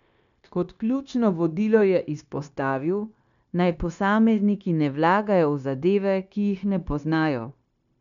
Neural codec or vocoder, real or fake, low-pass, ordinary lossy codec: codec, 16 kHz, 0.9 kbps, LongCat-Audio-Codec; fake; 7.2 kHz; none